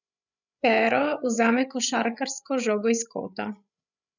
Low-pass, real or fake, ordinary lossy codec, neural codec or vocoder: 7.2 kHz; fake; none; codec, 16 kHz, 8 kbps, FreqCodec, larger model